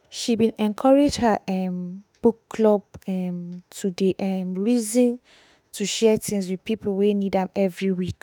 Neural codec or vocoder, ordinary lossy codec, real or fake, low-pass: autoencoder, 48 kHz, 32 numbers a frame, DAC-VAE, trained on Japanese speech; none; fake; none